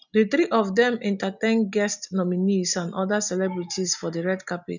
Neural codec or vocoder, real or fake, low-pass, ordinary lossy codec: none; real; 7.2 kHz; none